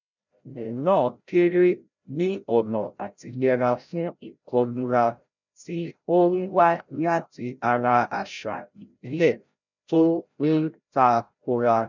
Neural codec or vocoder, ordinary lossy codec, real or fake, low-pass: codec, 16 kHz, 0.5 kbps, FreqCodec, larger model; none; fake; 7.2 kHz